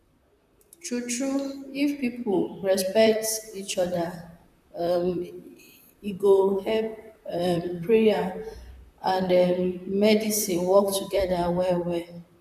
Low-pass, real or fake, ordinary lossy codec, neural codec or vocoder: 14.4 kHz; fake; none; vocoder, 44.1 kHz, 128 mel bands, Pupu-Vocoder